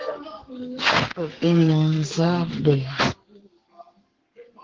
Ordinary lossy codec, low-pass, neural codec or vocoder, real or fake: Opus, 16 kbps; 7.2 kHz; codec, 32 kHz, 1.9 kbps, SNAC; fake